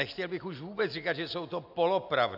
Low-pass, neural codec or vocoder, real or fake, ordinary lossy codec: 5.4 kHz; none; real; AAC, 48 kbps